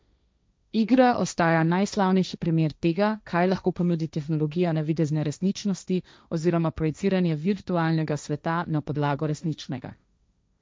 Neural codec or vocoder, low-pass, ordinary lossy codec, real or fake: codec, 16 kHz, 1.1 kbps, Voila-Tokenizer; none; none; fake